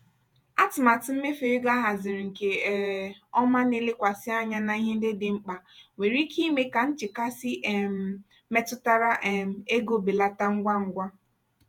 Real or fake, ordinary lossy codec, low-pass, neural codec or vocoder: fake; none; none; vocoder, 48 kHz, 128 mel bands, Vocos